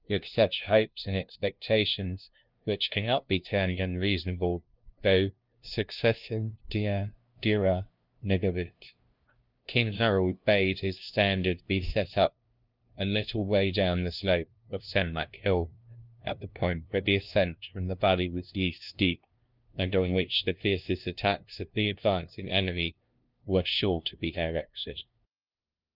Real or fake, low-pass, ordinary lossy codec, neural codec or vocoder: fake; 5.4 kHz; Opus, 24 kbps; codec, 16 kHz, 0.5 kbps, FunCodec, trained on LibriTTS, 25 frames a second